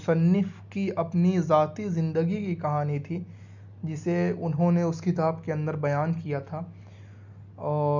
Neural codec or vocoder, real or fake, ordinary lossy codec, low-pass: none; real; none; 7.2 kHz